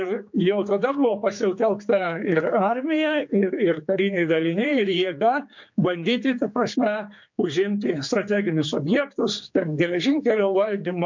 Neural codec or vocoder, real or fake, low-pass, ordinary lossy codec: codec, 16 kHz, 4 kbps, X-Codec, HuBERT features, trained on general audio; fake; 7.2 kHz; MP3, 48 kbps